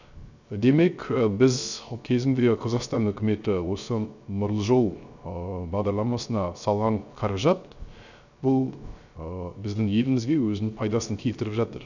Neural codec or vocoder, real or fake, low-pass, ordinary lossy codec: codec, 16 kHz, 0.3 kbps, FocalCodec; fake; 7.2 kHz; none